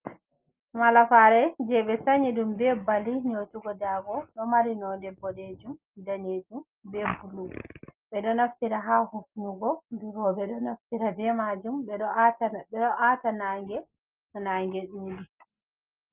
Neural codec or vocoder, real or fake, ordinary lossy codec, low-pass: none; real; Opus, 32 kbps; 3.6 kHz